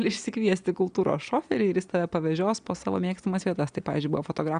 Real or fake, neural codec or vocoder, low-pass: real; none; 9.9 kHz